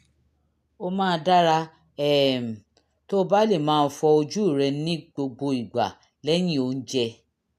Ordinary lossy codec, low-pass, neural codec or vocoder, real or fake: AAC, 96 kbps; 14.4 kHz; none; real